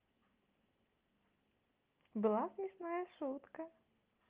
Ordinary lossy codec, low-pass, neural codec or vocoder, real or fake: Opus, 32 kbps; 3.6 kHz; none; real